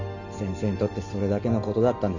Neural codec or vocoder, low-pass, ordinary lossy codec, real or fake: none; 7.2 kHz; none; real